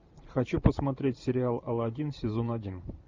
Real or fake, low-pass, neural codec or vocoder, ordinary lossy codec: fake; 7.2 kHz; vocoder, 44.1 kHz, 128 mel bands every 512 samples, BigVGAN v2; MP3, 64 kbps